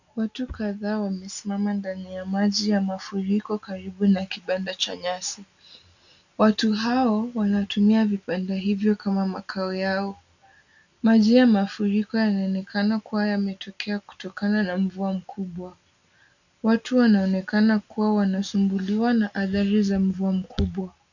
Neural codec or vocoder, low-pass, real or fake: none; 7.2 kHz; real